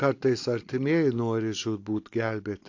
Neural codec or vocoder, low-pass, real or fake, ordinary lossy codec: none; 7.2 kHz; real; AAC, 48 kbps